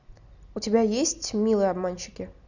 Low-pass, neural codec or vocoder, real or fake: 7.2 kHz; none; real